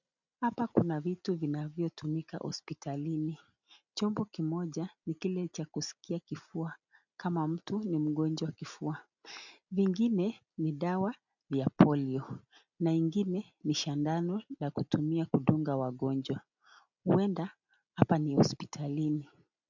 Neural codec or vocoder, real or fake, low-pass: none; real; 7.2 kHz